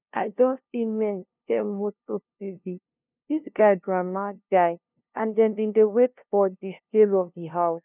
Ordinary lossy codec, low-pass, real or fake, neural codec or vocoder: none; 3.6 kHz; fake; codec, 16 kHz, 0.5 kbps, FunCodec, trained on LibriTTS, 25 frames a second